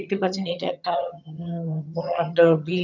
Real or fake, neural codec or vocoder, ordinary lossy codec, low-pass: fake; vocoder, 22.05 kHz, 80 mel bands, HiFi-GAN; none; 7.2 kHz